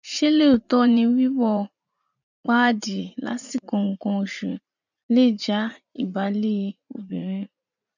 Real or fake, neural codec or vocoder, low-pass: fake; vocoder, 44.1 kHz, 80 mel bands, Vocos; 7.2 kHz